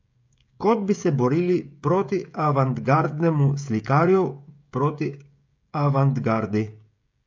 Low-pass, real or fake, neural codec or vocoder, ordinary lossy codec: 7.2 kHz; fake; codec, 16 kHz, 16 kbps, FreqCodec, smaller model; MP3, 48 kbps